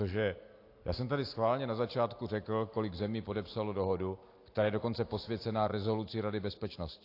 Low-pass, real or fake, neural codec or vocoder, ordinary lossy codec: 5.4 kHz; real; none; AAC, 32 kbps